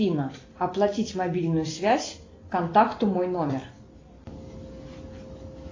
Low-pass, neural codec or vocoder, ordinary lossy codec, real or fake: 7.2 kHz; none; AAC, 32 kbps; real